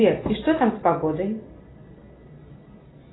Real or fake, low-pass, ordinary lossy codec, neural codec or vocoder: real; 7.2 kHz; AAC, 16 kbps; none